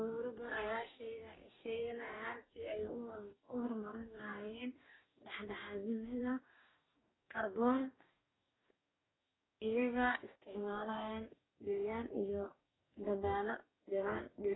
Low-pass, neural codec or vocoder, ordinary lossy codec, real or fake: 7.2 kHz; codec, 44.1 kHz, 2.6 kbps, DAC; AAC, 16 kbps; fake